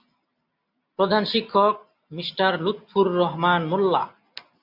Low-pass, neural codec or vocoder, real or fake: 5.4 kHz; none; real